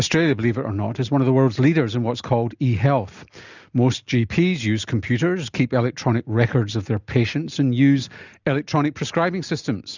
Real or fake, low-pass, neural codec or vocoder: real; 7.2 kHz; none